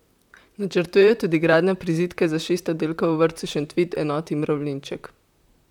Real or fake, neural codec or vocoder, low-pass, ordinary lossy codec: fake; vocoder, 44.1 kHz, 128 mel bands, Pupu-Vocoder; 19.8 kHz; none